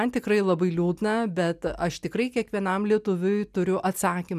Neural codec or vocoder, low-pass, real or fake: none; 14.4 kHz; real